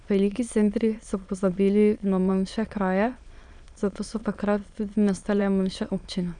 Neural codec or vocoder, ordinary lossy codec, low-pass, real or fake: autoencoder, 22.05 kHz, a latent of 192 numbers a frame, VITS, trained on many speakers; none; 9.9 kHz; fake